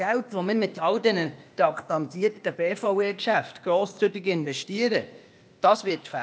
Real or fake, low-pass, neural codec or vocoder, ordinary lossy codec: fake; none; codec, 16 kHz, 0.8 kbps, ZipCodec; none